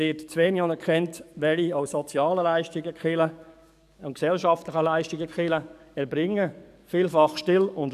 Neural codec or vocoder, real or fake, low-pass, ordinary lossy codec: codec, 44.1 kHz, 7.8 kbps, DAC; fake; 14.4 kHz; none